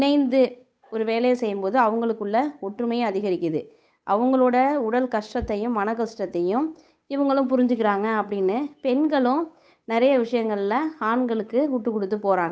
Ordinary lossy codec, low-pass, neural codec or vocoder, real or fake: none; none; codec, 16 kHz, 8 kbps, FunCodec, trained on Chinese and English, 25 frames a second; fake